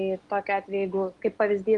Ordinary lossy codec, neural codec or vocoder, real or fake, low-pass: Opus, 24 kbps; none; real; 10.8 kHz